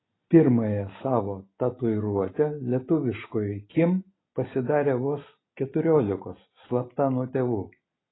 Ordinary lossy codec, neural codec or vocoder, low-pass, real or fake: AAC, 16 kbps; none; 7.2 kHz; real